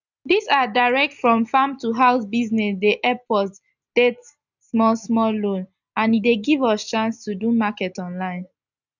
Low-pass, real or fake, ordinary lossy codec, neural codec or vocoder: 7.2 kHz; real; none; none